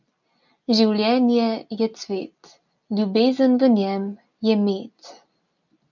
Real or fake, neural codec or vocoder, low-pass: real; none; 7.2 kHz